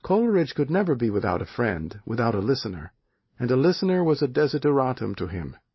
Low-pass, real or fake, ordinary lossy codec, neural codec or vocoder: 7.2 kHz; real; MP3, 24 kbps; none